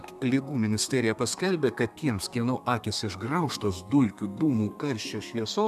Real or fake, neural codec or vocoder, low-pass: fake; codec, 32 kHz, 1.9 kbps, SNAC; 14.4 kHz